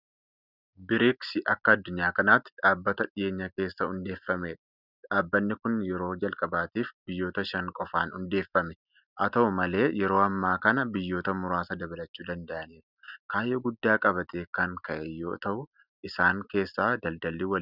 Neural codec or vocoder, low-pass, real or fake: none; 5.4 kHz; real